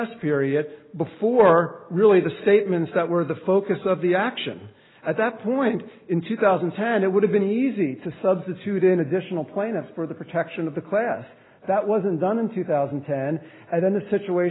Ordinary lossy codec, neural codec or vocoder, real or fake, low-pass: AAC, 16 kbps; none; real; 7.2 kHz